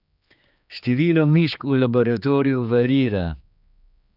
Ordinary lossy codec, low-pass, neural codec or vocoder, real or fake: none; 5.4 kHz; codec, 16 kHz, 2 kbps, X-Codec, HuBERT features, trained on general audio; fake